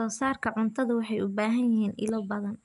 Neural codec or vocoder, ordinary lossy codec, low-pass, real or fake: none; none; 10.8 kHz; real